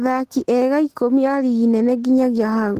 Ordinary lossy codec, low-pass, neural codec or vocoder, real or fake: Opus, 16 kbps; 14.4 kHz; autoencoder, 48 kHz, 128 numbers a frame, DAC-VAE, trained on Japanese speech; fake